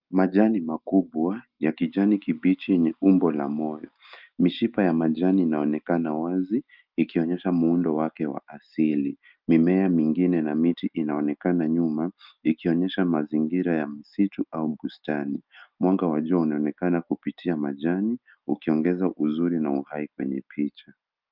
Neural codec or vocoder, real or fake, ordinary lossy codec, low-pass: none; real; Opus, 24 kbps; 5.4 kHz